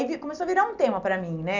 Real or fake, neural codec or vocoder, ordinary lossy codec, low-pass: real; none; none; 7.2 kHz